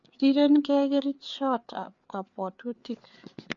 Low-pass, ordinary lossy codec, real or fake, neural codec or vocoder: 7.2 kHz; MP3, 48 kbps; fake; codec, 16 kHz, 4 kbps, FreqCodec, larger model